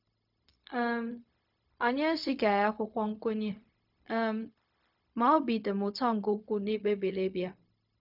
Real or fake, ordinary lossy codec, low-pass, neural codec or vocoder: fake; none; 5.4 kHz; codec, 16 kHz, 0.4 kbps, LongCat-Audio-Codec